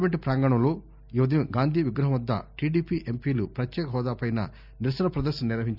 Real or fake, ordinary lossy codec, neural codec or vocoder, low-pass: real; none; none; 5.4 kHz